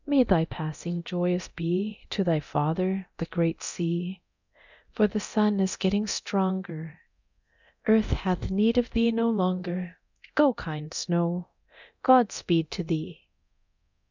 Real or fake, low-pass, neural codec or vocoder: fake; 7.2 kHz; codec, 24 kHz, 0.9 kbps, DualCodec